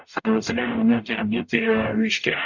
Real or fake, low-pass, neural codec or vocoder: fake; 7.2 kHz; codec, 44.1 kHz, 0.9 kbps, DAC